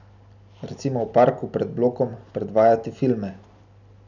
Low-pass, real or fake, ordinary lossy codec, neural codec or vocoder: 7.2 kHz; real; none; none